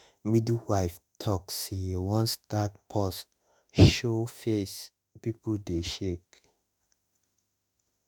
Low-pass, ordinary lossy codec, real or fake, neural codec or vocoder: none; none; fake; autoencoder, 48 kHz, 32 numbers a frame, DAC-VAE, trained on Japanese speech